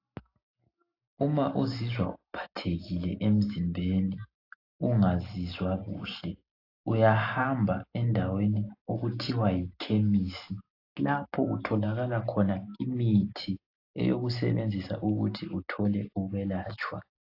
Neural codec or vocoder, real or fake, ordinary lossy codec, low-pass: none; real; AAC, 32 kbps; 5.4 kHz